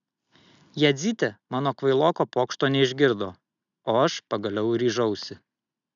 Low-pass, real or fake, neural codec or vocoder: 7.2 kHz; real; none